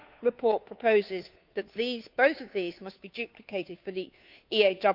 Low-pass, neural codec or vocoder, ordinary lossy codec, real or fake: 5.4 kHz; codec, 16 kHz, 2 kbps, FunCodec, trained on Chinese and English, 25 frames a second; none; fake